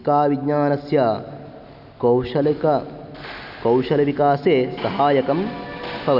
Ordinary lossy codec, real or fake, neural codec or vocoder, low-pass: none; real; none; 5.4 kHz